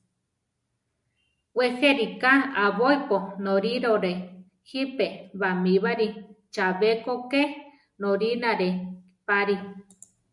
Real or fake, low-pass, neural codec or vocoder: real; 10.8 kHz; none